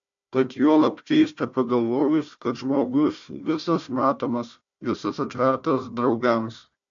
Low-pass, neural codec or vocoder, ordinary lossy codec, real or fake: 7.2 kHz; codec, 16 kHz, 1 kbps, FunCodec, trained on Chinese and English, 50 frames a second; MP3, 64 kbps; fake